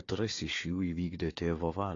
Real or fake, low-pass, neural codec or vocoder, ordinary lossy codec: fake; 7.2 kHz; codec, 16 kHz, 4 kbps, FunCodec, trained on Chinese and English, 50 frames a second; AAC, 32 kbps